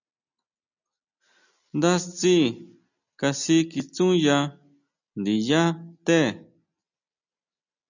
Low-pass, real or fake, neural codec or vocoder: 7.2 kHz; real; none